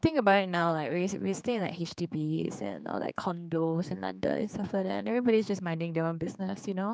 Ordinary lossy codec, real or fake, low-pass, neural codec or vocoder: none; fake; none; codec, 16 kHz, 4 kbps, X-Codec, HuBERT features, trained on general audio